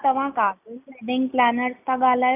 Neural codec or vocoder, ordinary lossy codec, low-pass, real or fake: none; none; 3.6 kHz; real